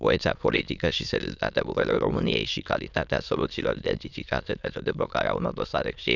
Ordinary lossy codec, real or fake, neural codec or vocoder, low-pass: none; fake; autoencoder, 22.05 kHz, a latent of 192 numbers a frame, VITS, trained on many speakers; 7.2 kHz